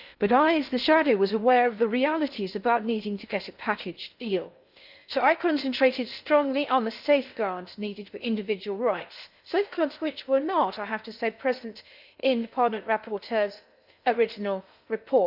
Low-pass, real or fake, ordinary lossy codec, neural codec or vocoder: 5.4 kHz; fake; none; codec, 16 kHz in and 24 kHz out, 0.6 kbps, FocalCodec, streaming, 2048 codes